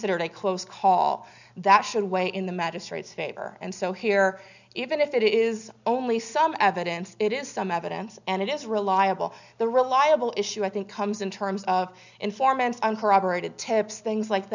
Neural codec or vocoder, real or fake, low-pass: none; real; 7.2 kHz